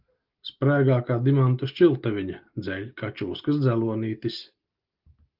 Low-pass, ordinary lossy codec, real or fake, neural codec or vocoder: 5.4 kHz; Opus, 24 kbps; real; none